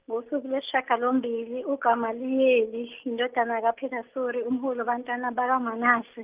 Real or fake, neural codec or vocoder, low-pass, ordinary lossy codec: real; none; 3.6 kHz; Opus, 24 kbps